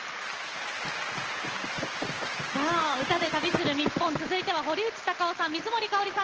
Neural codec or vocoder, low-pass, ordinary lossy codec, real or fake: none; 7.2 kHz; Opus, 16 kbps; real